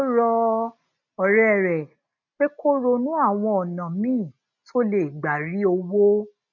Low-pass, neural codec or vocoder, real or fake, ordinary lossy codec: 7.2 kHz; none; real; none